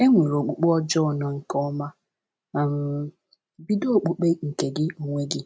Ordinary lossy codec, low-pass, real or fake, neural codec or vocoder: none; none; real; none